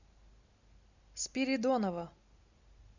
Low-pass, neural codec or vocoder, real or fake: 7.2 kHz; none; real